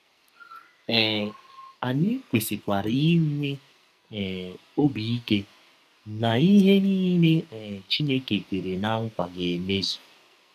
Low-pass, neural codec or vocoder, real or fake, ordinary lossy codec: 14.4 kHz; codec, 44.1 kHz, 2.6 kbps, SNAC; fake; none